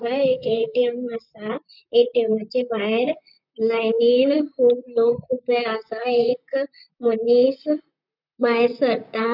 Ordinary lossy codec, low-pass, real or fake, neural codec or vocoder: none; 5.4 kHz; fake; vocoder, 44.1 kHz, 128 mel bands, Pupu-Vocoder